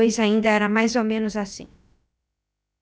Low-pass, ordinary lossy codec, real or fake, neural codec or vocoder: none; none; fake; codec, 16 kHz, about 1 kbps, DyCAST, with the encoder's durations